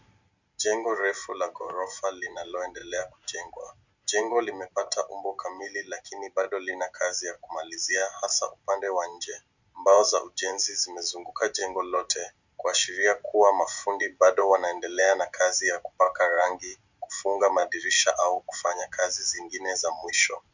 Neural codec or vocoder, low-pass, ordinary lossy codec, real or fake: none; 7.2 kHz; Opus, 64 kbps; real